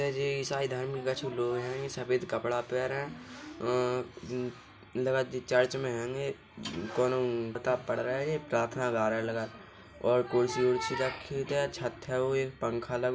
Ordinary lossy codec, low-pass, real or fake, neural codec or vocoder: none; none; real; none